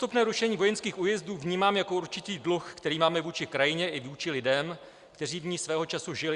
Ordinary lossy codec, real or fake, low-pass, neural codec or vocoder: Opus, 64 kbps; real; 10.8 kHz; none